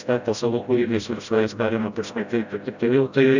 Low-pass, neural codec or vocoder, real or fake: 7.2 kHz; codec, 16 kHz, 0.5 kbps, FreqCodec, smaller model; fake